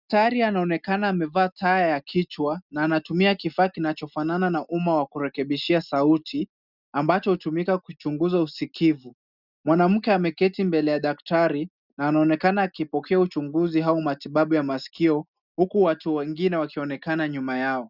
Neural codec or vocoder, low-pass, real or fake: none; 5.4 kHz; real